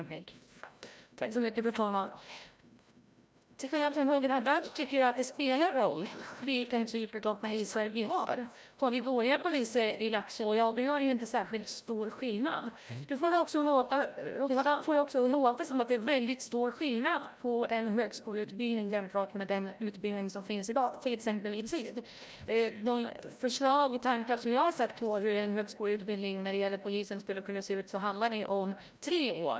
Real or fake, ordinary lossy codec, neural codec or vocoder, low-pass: fake; none; codec, 16 kHz, 0.5 kbps, FreqCodec, larger model; none